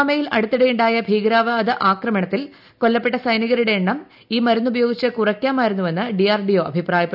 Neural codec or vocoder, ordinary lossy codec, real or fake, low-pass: none; none; real; 5.4 kHz